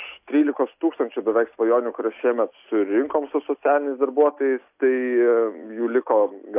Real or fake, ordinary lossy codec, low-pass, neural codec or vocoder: real; AAC, 32 kbps; 3.6 kHz; none